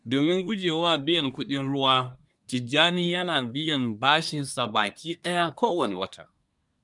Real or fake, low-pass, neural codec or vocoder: fake; 10.8 kHz; codec, 24 kHz, 1 kbps, SNAC